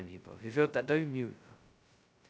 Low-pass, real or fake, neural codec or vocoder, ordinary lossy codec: none; fake; codec, 16 kHz, 0.2 kbps, FocalCodec; none